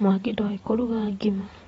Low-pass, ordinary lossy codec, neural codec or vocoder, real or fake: 19.8 kHz; AAC, 24 kbps; vocoder, 48 kHz, 128 mel bands, Vocos; fake